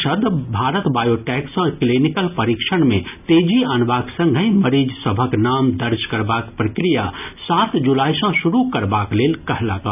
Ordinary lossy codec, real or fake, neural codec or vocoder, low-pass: none; real; none; 3.6 kHz